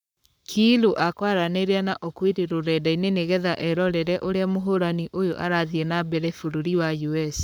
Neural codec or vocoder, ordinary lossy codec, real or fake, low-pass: codec, 44.1 kHz, 7.8 kbps, Pupu-Codec; none; fake; none